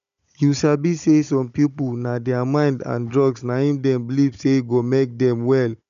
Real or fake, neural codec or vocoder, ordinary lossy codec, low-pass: fake; codec, 16 kHz, 16 kbps, FunCodec, trained on Chinese and English, 50 frames a second; none; 7.2 kHz